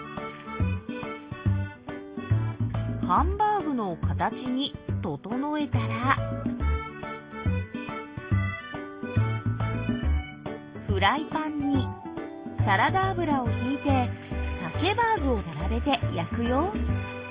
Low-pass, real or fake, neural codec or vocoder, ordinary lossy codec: 3.6 kHz; real; none; Opus, 32 kbps